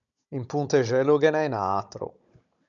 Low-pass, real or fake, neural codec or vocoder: 7.2 kHz; fake; codec, 16 kHz, 16 kbps, FunCodec, trained on Chinese and English, 50 frames a second